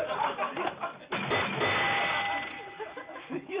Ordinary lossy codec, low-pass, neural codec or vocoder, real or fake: Opus, 64 kbps; 3.6 kHz; none; real